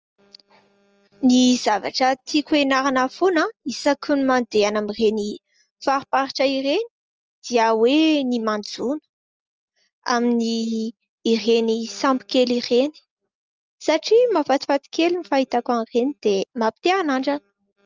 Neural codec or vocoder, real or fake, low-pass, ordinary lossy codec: none; real; 7.2 kHz; Opus, 24 kbps